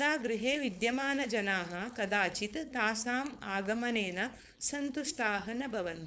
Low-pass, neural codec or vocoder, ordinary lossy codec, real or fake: none; codec, 16 kHz, 4.8 kbps, FACodec; none; fake